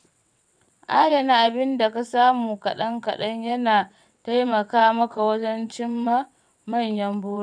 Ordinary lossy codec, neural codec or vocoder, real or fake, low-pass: none; vocoder, 22.05 kHz, 80 mel bands, WaveNeXt; fake; 9.9 kHz